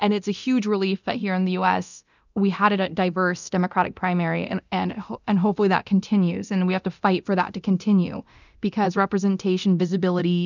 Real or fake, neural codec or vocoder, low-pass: fake; codec, 24 kHz, 0.9 kbps, DualCodec; 7.2 kHz